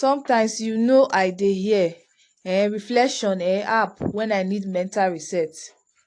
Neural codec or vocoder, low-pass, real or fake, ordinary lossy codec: none; 9.9 kHz; real; AAC, 48 kbps